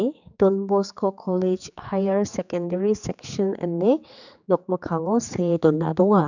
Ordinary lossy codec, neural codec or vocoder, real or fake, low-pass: none; codec, 16 kHz, 4 kbps, X-Codec, HuBERT features, trained on general audio; fake; 7.2 kHz